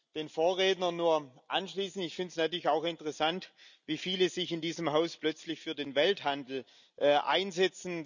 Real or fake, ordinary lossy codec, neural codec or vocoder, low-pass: real; none; none; 7.2 kHz